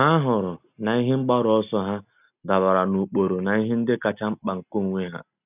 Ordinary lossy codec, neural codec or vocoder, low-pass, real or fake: none; none; 3.6 kHz; real